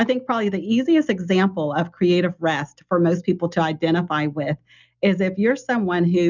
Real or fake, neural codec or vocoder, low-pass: real; none; 7.2 kHz